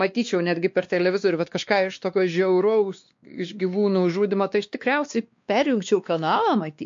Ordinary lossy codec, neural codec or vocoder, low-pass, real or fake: MP3, 48 kbps; codec, 16 kHz, 2 kbps, X-Codec, WavLM features, trained on Multilingual LibriSpeech; 7.2 kHz; fake